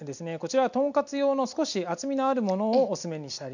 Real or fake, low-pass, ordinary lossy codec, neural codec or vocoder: real; 7.2 kHz; none; none